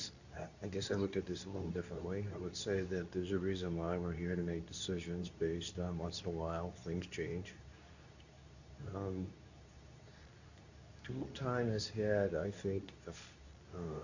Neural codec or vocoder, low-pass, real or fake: codec, 24 kHz, 0.9 kbps, WavTokenizer, medium speech release version 2; 7.2 kHz; fake